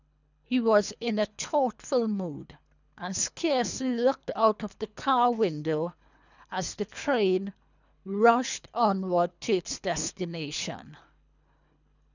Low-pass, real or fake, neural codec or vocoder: 7.2 kHz; fake; codec, 24 kHz, 3 kbps, HILCodec